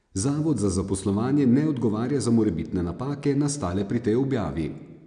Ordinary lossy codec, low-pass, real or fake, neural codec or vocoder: none; 9.9 kHz; real; none